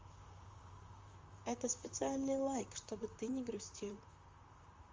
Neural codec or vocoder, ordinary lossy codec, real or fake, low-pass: vocoder, 44.1 kHz, 128 mel bands, Pupu-Vocoder; Opus, 32 kbps; fake; 7.2 kHz